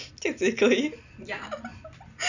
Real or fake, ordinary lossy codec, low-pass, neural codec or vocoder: real; none; 7.2 kHz; none